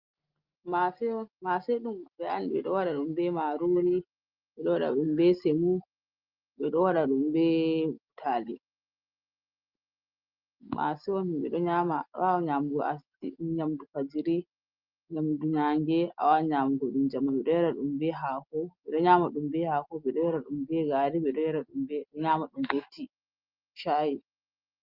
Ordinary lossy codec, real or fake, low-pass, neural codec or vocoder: Opus, 32 kbps; real; 5.4 kHz; none